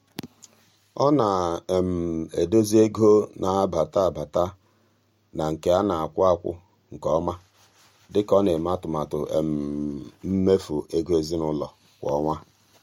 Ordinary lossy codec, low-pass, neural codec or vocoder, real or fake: MP3, 64 kbps; 19.8 kHz; none; real